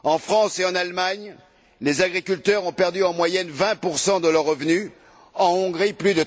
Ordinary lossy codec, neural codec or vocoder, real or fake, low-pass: none; none; real; none